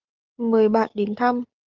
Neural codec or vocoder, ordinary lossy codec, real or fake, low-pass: none; Opus, 32 kbps; real; 7.2 kHz